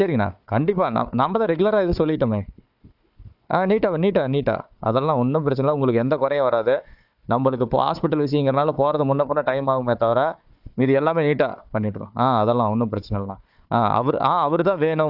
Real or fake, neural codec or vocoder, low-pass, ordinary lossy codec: fake; codec, 16 kHz, 4 kbps, FunCodec, trained on Chinese and English, 50 frames a second; 5.4 kHz; AAC, 48 kbps